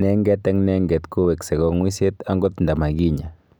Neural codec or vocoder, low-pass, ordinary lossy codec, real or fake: none; none; none; real